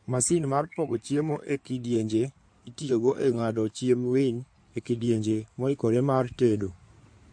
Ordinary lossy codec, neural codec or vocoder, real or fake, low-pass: MP3, 48 kbps; codec, 16 kHz in and 24 kHz out, 2.2 kbps, FireRedTTS-2 codec; fake; 9.9 kHz